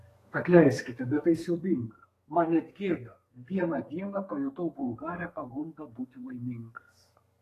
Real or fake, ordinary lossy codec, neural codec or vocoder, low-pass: fake; AAC, 48 kbps; codec, 32 kHz, 1.9 kbps, SNAC; 14.4 kHz